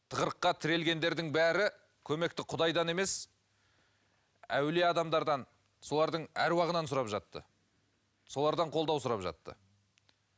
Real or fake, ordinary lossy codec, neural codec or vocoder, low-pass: real; none; none; none